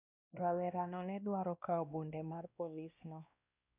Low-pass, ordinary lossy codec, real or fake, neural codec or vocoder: 3.6 kHz; none; fake; codec, 16 kHz, 1 kbps, X-Codec, WavLM features, trained on Multilingual LibriSpeech